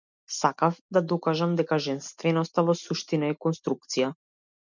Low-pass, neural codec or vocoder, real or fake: 7.2 kHz; none; real